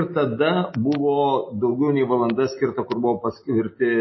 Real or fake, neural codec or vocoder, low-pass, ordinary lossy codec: real; none; 7.2 kHz; MP3, 24 kbps